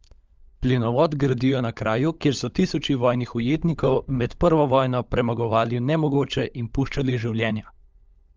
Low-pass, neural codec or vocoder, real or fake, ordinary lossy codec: 7.2 kHz; codec, 16 kHz, 16 kbps, FunCodec, trained on LibriTTS, 50 frames a second; fake; Opus, 24 kbps